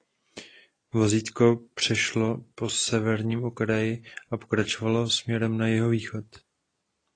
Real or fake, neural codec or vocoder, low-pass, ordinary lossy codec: real; none; 9.9 kHz; AAC, 32 kbps